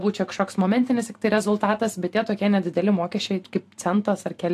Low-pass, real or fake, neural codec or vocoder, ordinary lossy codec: 14.4 kHz; fake; vocoder, 44.1 kHz, 128 mel bands every 512 samples, BigVGAN v2; AAC, 64 kbps